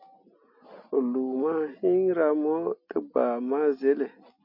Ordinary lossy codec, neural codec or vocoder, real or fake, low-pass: MP3, 32 kbps; none; real; 5.4 kHz